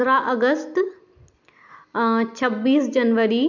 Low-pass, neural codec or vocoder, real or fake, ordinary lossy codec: 7.2 kHz; none; real; none